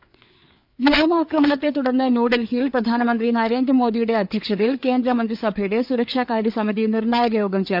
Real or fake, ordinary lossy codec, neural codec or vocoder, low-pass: fake; none; codec, 16 kHz, 4 kbps, FreqCodec, larger model; 5.4 kHz